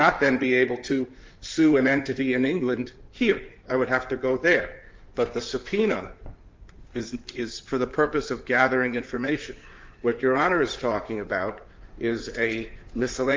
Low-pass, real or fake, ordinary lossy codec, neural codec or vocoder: 7.2 kHz; fake; Opus, 24 kbps; codec, 16 kHz in and 24 kHz out, 2.2 kbps, FireRedTTS-2 codec